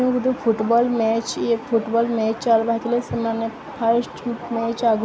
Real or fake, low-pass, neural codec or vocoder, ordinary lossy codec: real; none; none; none